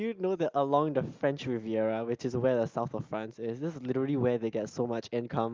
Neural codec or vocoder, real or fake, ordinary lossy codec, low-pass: none; real; Opus, 32 kbps; 7.2 kHz